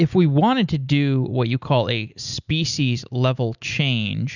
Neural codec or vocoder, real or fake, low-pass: none; real; 7.2 kHz